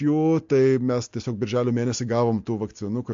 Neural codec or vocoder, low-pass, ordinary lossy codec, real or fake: none; 7.2 kHz; MP3, 48 kbps; real